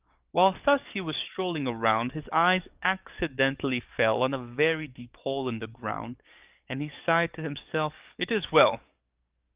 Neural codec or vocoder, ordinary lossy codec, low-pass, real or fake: codec, 44.1 kHz, 7.8 kbps, DAC; Opus, 24 kbps; 3.6 kHz; fake